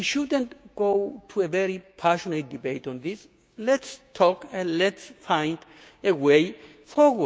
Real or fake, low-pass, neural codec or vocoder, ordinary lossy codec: fake; none; codec, 16 kHz, 6 kbps, DAC; none